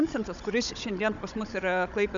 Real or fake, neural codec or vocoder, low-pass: fake; codec, 16 kHz, 16 kbps, FunCodec, trained on Chinese and English, 50 frames a second; 7.2 kHz